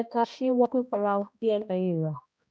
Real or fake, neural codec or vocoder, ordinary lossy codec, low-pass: fake; codec, 16 kHz, 1 kbps, X-Codec, HuBERT features, trained on balanced general audio; none; none